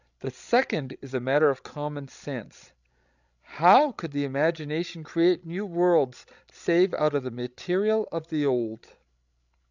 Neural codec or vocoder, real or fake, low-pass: codec, 16 kHz, 16 kbps, FreqCodec, larger model; fake; 7.2 kHz